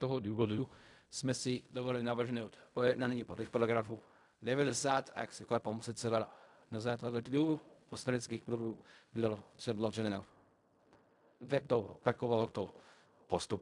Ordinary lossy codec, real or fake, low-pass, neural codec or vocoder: MP3, 96 kbps; fake; 10.8 kHz; codec, 16 kHz in and 24 kHz out, 0.4 kbps, LongCat-Audio-Codec, fine tuned four codebook decoder